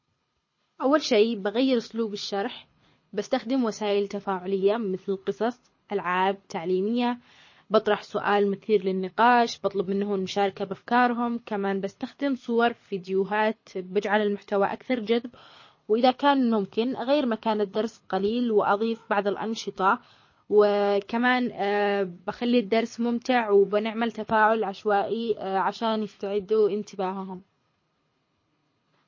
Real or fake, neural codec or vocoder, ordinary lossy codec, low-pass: fake; codec, 24 kHz, 6 kbps, HILCodec; MP3, 32 kbps; 7.2 kHz